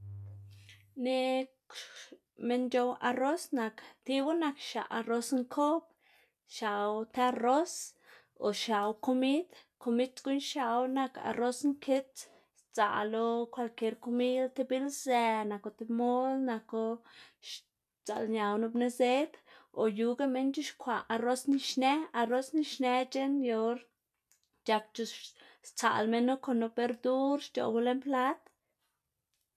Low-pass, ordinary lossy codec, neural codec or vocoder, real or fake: 14.4 kHz; none; none; real